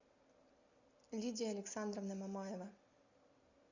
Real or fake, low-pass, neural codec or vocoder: real; 7.2 kHz; none